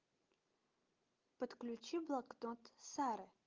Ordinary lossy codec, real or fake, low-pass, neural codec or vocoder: Opus, 32 kbps; real; 7.2 kHz; none